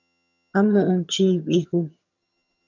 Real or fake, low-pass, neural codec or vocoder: fake; 7.2 kHz; vocoder, 22.05 kHz, 80 mel bands, HiFi-GAN